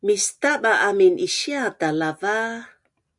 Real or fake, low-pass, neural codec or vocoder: real; 10.8 kHz; none